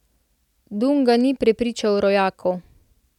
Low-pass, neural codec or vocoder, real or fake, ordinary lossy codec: 19.8 kHz; none; real; none